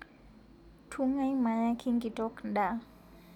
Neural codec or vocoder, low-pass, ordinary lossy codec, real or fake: none; 19.8 kHz; none; real